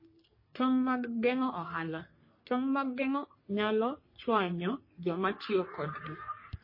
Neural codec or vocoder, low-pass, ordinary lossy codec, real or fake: codec, 44.1 kHz, 3.4 kbps, Pupu-Codec; 5.4 kHz; MP3, 24 kbps; fake